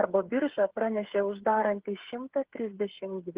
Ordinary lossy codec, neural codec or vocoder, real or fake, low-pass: Opus, 16 kbps; codec, 16 kHz, 8 kbps, FreqCodec, smaller model; fake; 3.6 kHz